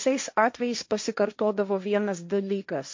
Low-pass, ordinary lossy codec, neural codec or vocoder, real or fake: 7.2 kHz; MP3, 48 kbps; codec, 16 kHz, 1.1 kbps, Voila-Tokenizer; fake